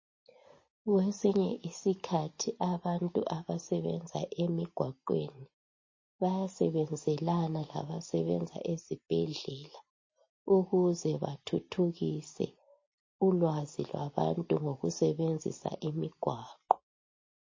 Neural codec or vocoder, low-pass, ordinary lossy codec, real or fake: none; 7.2 kHz; MP3, 32 kbps; real